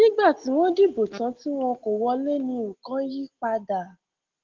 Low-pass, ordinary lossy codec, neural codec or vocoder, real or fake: 7.2 kHz; Opus, 16 kbps; none; real